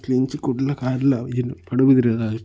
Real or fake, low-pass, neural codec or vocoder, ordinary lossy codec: real; none; none; none